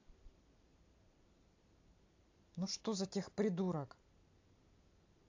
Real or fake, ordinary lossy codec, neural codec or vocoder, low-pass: fake; AAC, 48 kbps; vocoder, 44.1 kHz, 128 mel bands every 256 samples, BigVGAN v2; 7.2 kHz